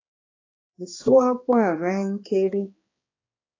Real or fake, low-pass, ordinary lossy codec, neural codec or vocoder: fake; 7.2 kHz; AAC, 32 kbps; codec, 16 kHz, 2 kbps, X-Codec, HuBERT features, trained on balanced general audio